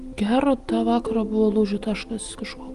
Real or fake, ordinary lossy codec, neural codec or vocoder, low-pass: real; Opus, 24 kbps; none; 10.8 kHz